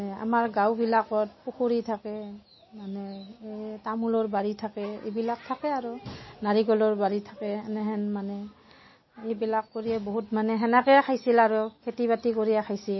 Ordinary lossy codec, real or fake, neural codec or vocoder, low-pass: MP3, 24 kbps; real; none; 7.2 kHz